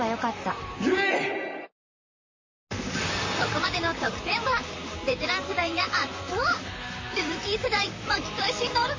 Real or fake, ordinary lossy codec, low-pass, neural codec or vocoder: fake; MP3, 32 kbps; 7.2 kHz; vocoder, 44.1 kHz, 128 mel bands, Pupu-Vocoder